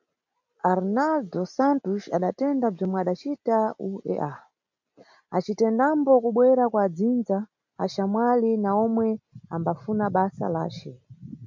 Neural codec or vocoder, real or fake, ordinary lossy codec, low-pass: none; real; MP3, 64 kbps; 7.2 kHz